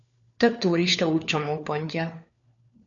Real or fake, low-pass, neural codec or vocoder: fake; 7.2 kHz; codec, 16 kHz, 4 kbps, FunCodec, trained on LibriTTS, 50 frames a second